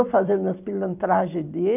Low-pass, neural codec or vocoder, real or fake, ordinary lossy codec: 3.6 kHz; vocoder, 44.1 kHz, 128 mel bands every 256 samples, BigVGAN v2; fake; none